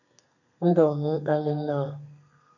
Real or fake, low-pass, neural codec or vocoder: fake; 7.2 kHz; codec, 32 kHz, 1.9 kbps, SNAC